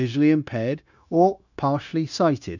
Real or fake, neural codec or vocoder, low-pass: fake; codec, 16 kHz, 0.9 kbps, LongCat-Audio-Codec; 7.2 kHz